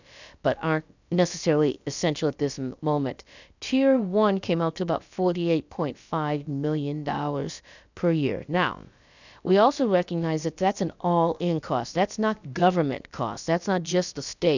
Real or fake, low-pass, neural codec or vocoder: fake; 7.2 kHz; codec, 16 kHz, about 1 kbps, DyCAST, with the encoder's durations